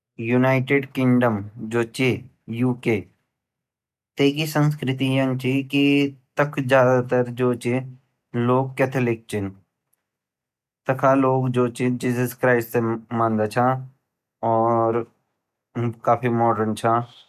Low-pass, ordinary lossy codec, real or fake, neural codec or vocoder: 14.4 kHz; none; real; none